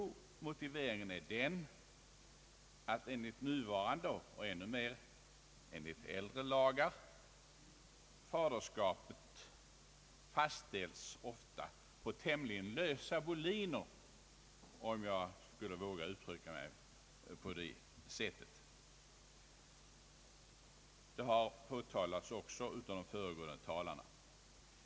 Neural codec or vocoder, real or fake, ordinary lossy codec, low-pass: none; real; none; none